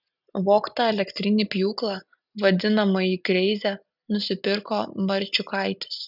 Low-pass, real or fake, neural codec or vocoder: 5.4 kHz; real; none